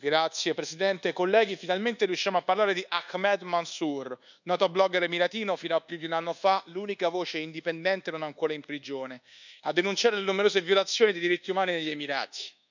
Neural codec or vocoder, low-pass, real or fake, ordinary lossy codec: codec, 24 kHz, 1.2 kbps, DualCodec; 7.2 kHz; fake; none